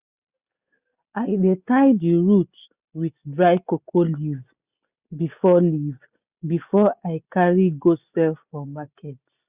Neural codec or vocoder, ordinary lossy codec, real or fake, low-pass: none; Opus, 64 kbps; real; 3.6 kHz